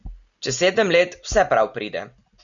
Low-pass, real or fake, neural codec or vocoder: 7.2 kHz; real; none